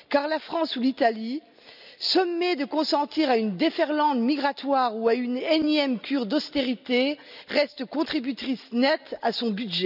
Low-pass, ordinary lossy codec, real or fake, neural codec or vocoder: 5.4 kHz; none; real; none